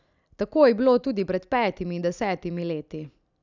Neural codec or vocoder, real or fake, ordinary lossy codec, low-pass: none; real; none; 7.2 kHz